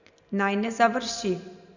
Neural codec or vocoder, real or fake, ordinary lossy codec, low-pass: none; real; Opus, 64 kbps; 7.2 kHz